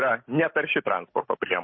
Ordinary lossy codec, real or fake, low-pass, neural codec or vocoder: MP3, 24 kbps; real; 7.2 kHz; none